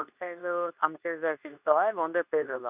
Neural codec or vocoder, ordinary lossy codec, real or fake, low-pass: codec, 24 kHz, 0.9 kbps, WavTokenizer, medium speech release version 2; none; fake; 3.6 kHz